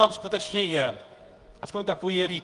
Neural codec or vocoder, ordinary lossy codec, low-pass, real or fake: codec, 24 kHz, 0.9 kbps, WavTokenizer, medium music audio release; Opus, 32 kbps; 10.8 kHz; fake